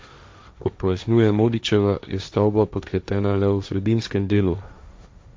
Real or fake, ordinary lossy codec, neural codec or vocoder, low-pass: fake; none; codec, 16 kHz, 1.1 kbps, Voila-Tokenizer; none